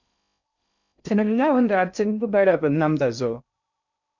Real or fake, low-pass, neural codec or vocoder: fake; 7.2 kHz; codec, 16 kHz in and 24 kHz out, 0.6 kbps, FocalCodec, streaming, 4096 codes